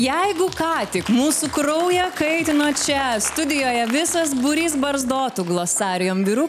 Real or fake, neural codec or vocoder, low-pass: real; none; 14.4 kHz